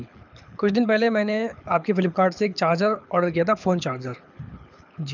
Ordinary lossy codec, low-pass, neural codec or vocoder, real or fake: none; 7.2 kHz; codec, 24 kHz, 6 kbps, HILCodec; fake